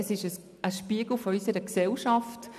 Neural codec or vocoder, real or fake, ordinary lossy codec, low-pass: none; real; none; 14.4 kHz